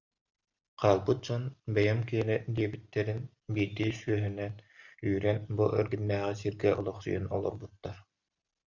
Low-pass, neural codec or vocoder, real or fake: 7.2 kHz; none; real